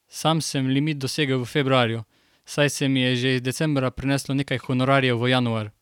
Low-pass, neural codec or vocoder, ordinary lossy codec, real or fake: 19.8 kHz; none; none; real